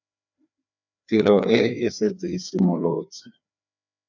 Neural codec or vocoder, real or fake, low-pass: codec, 16 kHz, 2 kbps, FreqCodec, larger model; fake; 7.2 kHz